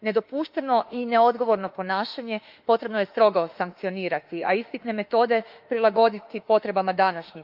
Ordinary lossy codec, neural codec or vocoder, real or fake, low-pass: Opus, 24 kbps; autoencoder, 48 kHz, 32 numbers a frame, DAC-VAE, trained on Japanese speech; fake; 5.4 kHz